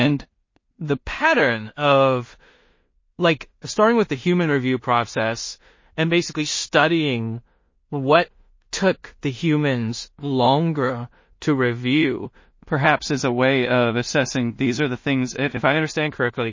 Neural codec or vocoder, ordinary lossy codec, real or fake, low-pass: codec, 16 kHz in and 24 kHz out, 0.4 kbps, LongCat-Audio-Codec, two codebook decoder; MP3, 32 kbps; fake; 7.2 kHz